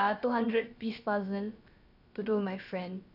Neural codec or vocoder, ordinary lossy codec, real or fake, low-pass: codec, 16 kHz, about 1 kbps, DyCAST, with the encoder's durations; none; fake; 5.4 kHz